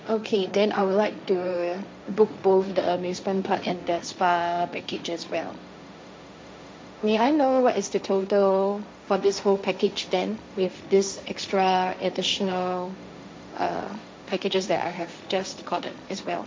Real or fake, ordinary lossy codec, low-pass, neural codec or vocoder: fake; none; none; codec, 16 kHz, 1.1 kbps, Voila-Tokenizer